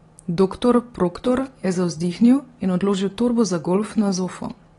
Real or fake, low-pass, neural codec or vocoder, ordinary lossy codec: real; 10.8 kHz; none; AAC, 32 kbps